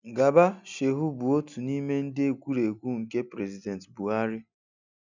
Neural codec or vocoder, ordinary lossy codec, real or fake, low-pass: none; none; real; 7.2 kHz